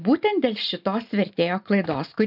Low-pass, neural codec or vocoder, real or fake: 5.4 kHz; none; real